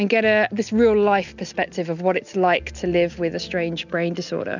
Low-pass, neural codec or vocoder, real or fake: 7.2 kHz; none; real